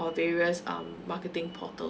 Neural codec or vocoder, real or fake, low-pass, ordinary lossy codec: none; real; none; none